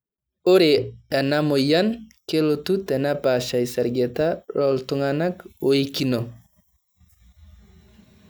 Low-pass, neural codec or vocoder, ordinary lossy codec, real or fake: none; none; none; real